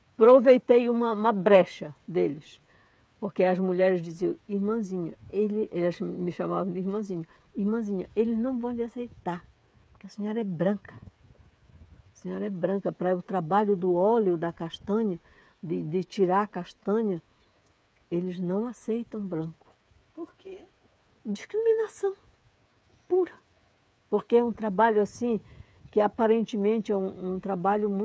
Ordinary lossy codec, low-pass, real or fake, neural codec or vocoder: none; none; fake; codec, 16 kHz, 8 kbps, FreqCodec, smaller model